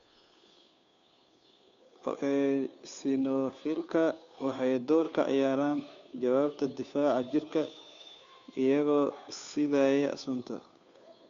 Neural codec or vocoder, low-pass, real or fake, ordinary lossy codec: codec, 16 kHz, 2 kbps, FunCodec, trained on Chinese and English, 25 frames a second; 7.2 kHz; fake; MP3, 64 kbps